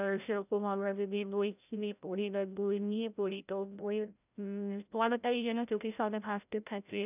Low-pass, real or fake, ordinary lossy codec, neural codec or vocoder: 3.6 kHz; fake; none; codec, 16 kHz, 0.5 kbps, FreqCodec, larger model